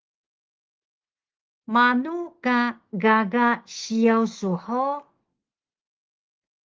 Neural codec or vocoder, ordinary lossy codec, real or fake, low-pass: autoencoder, 48 kHz, 128 numbers a frame, DAC-VAE, trained on Japanese speech; Opus, 16 kbps; fake; 7.2 kHz